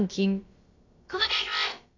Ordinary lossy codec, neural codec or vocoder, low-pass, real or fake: AAC, 32 kbps; codec, 16 kHz, about 1 kbps, DyCAST, with the encoder's durations; 7.2 kHz; fake